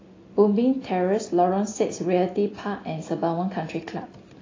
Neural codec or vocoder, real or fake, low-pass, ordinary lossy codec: none; real; 7.2 kHz; AAC, 32 kbps